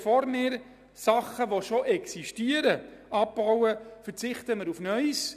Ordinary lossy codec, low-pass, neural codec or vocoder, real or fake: none; 14.4 kHz; none; real